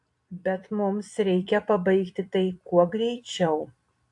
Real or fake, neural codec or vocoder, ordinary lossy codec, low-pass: fake; vocoder, 44.1 kHz, 128 mel bands every 256 samples, BigVGAN v2; AAC, 64 kbps; 10.8 kHz